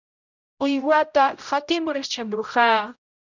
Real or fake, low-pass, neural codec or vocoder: fake; 7.2 kHz; codec, 16 kHz, 0.5 kbps, X-Codec, HuBERT features, trained on general audio